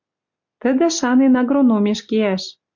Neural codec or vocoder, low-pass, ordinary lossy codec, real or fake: none; 7.2 kHz; MP3, 64 kbps; real